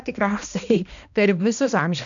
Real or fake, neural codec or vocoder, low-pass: fake; codec, 16 kHz, 1 kbps, X-Codec, HuBERT features, trained on balanced general audio; 7.2 kHz